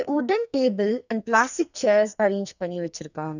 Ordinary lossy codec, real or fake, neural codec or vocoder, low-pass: none; fake; codec, 44.1 kHz, 2.6 kbps, SNAC; 7.2 kHz